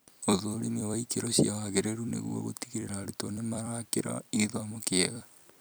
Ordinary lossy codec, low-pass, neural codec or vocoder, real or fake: none; none; vocoder, 44.1 kHz, 128 mel bands every 256 samples, BigVGAN v2; fake